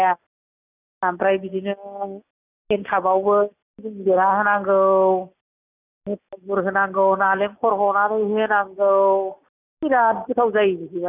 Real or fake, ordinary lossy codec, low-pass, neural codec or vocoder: real; none; 3.6 kHz; none